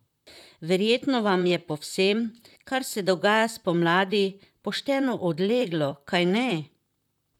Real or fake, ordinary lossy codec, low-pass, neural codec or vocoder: fake; none; 19.8 kHz; vocoder, 44.1 kHz, 128 mel bands, Pupu-Vocoder